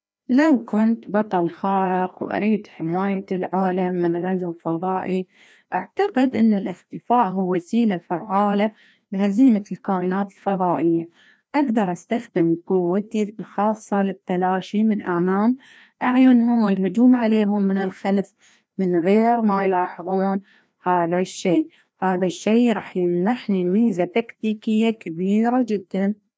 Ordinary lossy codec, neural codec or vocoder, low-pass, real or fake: none; codec, 16 kHz, 1 kbps, FreqCodec, larger model; none; fake